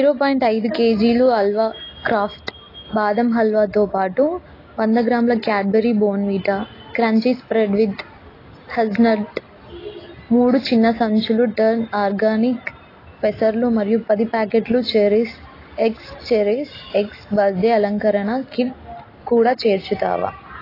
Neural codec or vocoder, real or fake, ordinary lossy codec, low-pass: none; real; AAC, 24 kbps; 5.4 kHz